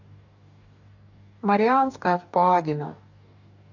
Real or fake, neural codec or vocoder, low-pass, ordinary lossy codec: fake; codec, 44.1 kHz, 2.6 kbps, DAC; 7.2 kHz; AAC, 48 kbps